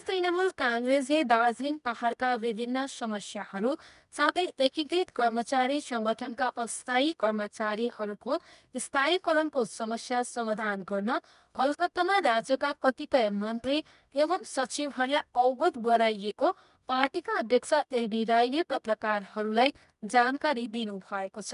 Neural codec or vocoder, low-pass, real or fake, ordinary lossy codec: codec, 24 kHz, 0.9 kbps, WavTokenizer, medium music audio release; 10.8 kHz; fake; none